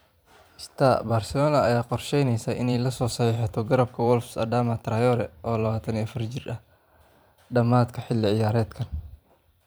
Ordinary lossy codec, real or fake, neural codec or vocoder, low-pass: none; fake; vocoder, 44.1 kHz, 128 mel bands every 512 samples, BigVGAN v2; none